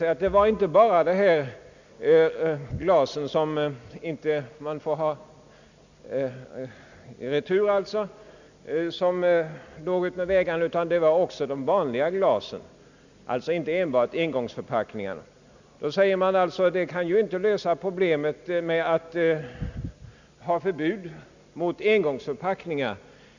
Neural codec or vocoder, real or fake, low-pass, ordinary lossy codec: none; real; 7.2 kHz; none